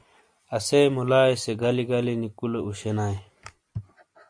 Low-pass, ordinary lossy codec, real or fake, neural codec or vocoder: 9.9 kHz; AAC, 64 kbps; real; none